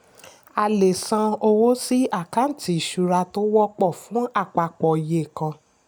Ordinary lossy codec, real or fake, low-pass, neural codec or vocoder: none; real; none; none